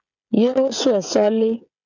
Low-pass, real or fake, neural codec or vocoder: 7.2 kHz; fake; codec, 16 kHz, 16 kbps, FreqCodec, smaller model